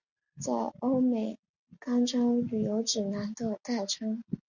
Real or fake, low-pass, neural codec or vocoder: real; 7.2 kHz; none